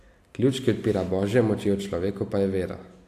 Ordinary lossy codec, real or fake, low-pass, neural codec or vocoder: AAC, 64 kbps; real; 14.4 kHz; none